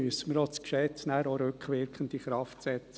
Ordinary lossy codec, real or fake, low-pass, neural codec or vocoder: none; real; none; none